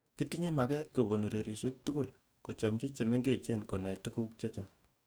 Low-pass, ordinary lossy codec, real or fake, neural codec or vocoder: none; none; fake; codec, 44.1 kHz, 2.6 kbps, DAC